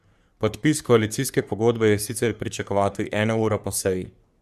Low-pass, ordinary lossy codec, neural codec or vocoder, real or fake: 14.4 kHz; Opus, 64 kbps; codec, 44.1 kHz, 3.4 kbps, Pupu-Codec; fake